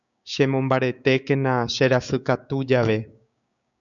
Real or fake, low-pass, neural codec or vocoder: fake; 7.2 kHz; codec, 16 kHz, 6 kbps, DAC